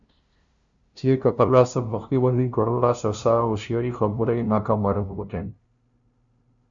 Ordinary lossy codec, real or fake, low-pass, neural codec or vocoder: Opus, 64 kbps; fake; 7.2 kHz; codec, 16 kHz, 0.5 kbps, FunCodec, trained on LibriTTS, 25 frames a second